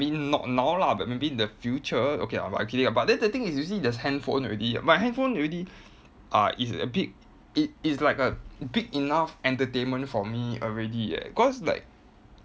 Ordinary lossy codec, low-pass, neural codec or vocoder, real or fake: none; none; none; real